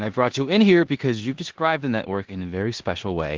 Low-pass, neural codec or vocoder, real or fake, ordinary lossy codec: 7.2 kHz; codec, 16 kHz in and 24 kHz out, 0.9 kbps, LongCat-Audio-Codec, four codebook decoder; fake; Opus, 16 kbps